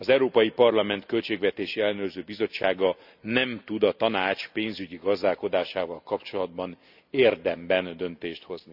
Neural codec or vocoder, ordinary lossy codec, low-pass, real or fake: none; AAC, 48 kbps; 5.4 kHz; real